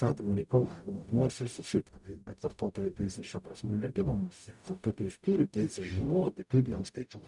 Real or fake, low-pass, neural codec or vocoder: fake; 10.8 kHz; codec, 44.1 kHz, 0.9 kbps, DAC